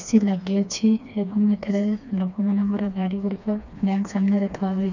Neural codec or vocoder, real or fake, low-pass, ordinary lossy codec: codec, 16 kHz, 2 kbps, FreqCodec, smaller model; fake; 7.2 kHz; none